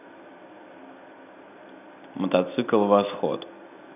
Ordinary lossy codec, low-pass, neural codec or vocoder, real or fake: none; 3.6 kHz; none; real